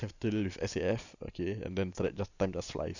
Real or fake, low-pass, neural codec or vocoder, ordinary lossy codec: real; 7.2 kHz; none; none